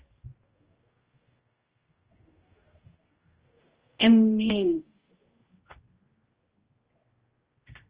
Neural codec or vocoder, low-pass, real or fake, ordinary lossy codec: codec, 16 kHz, 0.5 kbps, X-Codec, HuBERT features, trained on general audio; 3.6 kHz; fake; Opus, 16 kbps